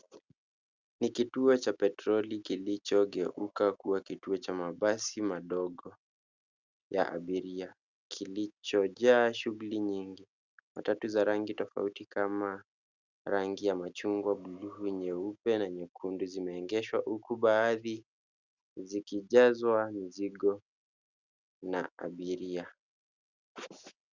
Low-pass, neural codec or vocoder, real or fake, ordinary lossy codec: 7.2 kHz; none; real; Opus, 64 kbps